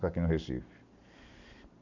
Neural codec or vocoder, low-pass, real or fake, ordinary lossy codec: none; 7.2 kHz; real; none